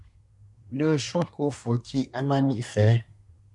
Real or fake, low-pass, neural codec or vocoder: fake; 10.8 kHz; codec, 24 kHz, 1 kbps, SNAC